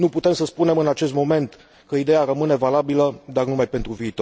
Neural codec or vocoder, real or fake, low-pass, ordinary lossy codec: none; real; none; none